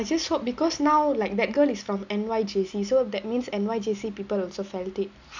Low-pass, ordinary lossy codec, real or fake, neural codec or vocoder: 7.2 kHz; none; real; none